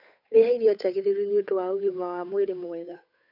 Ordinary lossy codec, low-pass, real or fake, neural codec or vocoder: none; 5.4 kHz; fake; codec, 16 kHz, 8 kbps, FunCodec, trained on Chinese and English, 25 frames a second